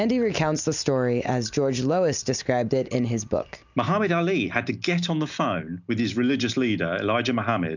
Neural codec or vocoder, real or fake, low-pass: none; real; 7.2 kHz